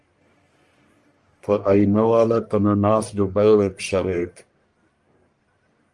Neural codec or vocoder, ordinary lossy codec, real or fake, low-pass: codec, 44.1 kHz, 1.7 kbps, Pupu-Codec; Opus, 32 kbps; fake; 10.8 kHz